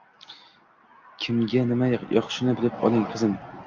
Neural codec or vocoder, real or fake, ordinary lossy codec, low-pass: none; real; Opus, 24 kbps; 7.2 kHz